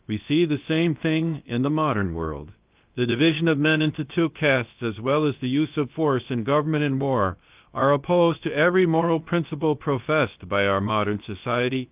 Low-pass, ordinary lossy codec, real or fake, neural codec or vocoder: 3.6 kHz; Opus, 24 kbps; fake; codec, 16 kHz, about 1 kbps, DyCAST, with the encoder's durations